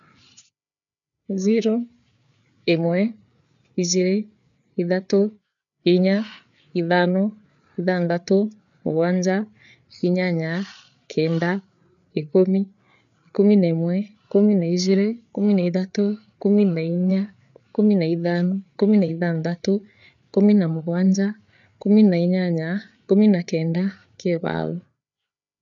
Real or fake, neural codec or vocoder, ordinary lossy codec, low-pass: fake; codec, 16 kHz, 8 kbps, FreqCodec, larger model; none; 7.2 kHz